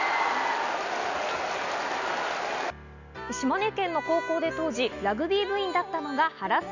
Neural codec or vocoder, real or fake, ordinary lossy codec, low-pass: none; real; none; 7.2 kHz